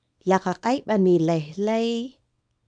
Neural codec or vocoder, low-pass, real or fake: codec, 24 kHz, 0.9 kbps, WavTokenizer, small release; 9.9 kHz; fake